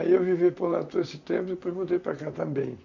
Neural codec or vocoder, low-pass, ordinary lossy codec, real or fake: vocoder, 44.1 kHz, 128 mel bands, Pupu-Vocoder; 7.2 kHz; none; fake